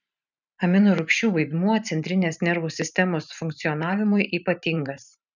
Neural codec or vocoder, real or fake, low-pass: vocoder, 44.1 kHz, 128 mel bands every 512 samples, BigVGAN v2; fake; 7.2 kHz